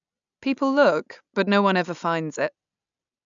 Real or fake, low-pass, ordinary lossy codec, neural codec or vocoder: real; 7.2 kHz; none; none